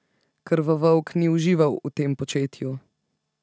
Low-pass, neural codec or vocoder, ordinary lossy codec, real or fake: none; none; none; real